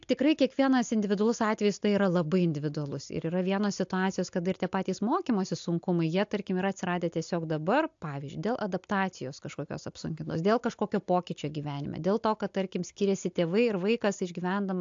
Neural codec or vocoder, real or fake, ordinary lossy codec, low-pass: none; real; AAC, 64 kbps; 7.2 kHz